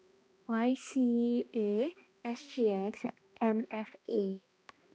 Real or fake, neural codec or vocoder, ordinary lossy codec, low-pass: fake; codec, 16 kHz, 1 kbps, X-Codec, HuBERT features, trained on balanced general audio; none; none